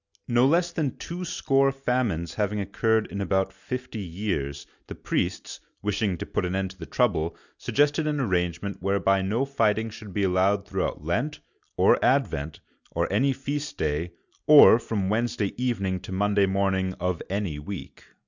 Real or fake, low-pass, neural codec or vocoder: real; 7.2 kHz; none